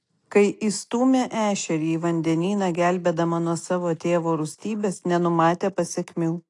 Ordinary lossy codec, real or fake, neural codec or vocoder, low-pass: AAC, 48 kbps; real; none; 10.8 kHz